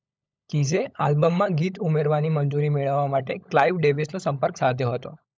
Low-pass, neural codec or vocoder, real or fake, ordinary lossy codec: none; codec, 16 kHz, 16 kbps, FunCodec, trained on LibriTTS, 50 frames a second; fake; none